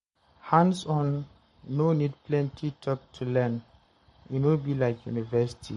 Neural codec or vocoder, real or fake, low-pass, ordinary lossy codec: codec, 44.1 kHz, 7.8 kbps, Pupu-Codec; fake; 19.8 kHz; MP3, 48 kbps